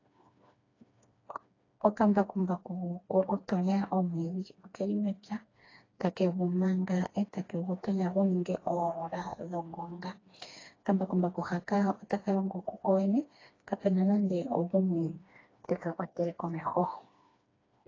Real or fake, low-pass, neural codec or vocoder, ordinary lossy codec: fake; 7.2 kHz; codec, 16 kHz, 2 kbps, FreqCodec, smaller model; AAC, 32 kbps